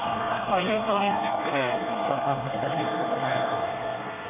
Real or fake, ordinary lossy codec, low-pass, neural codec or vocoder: fake; AAC, 24 kbps; 3.6 kHz; codec, 24 kHz, 1 kbps, SNAC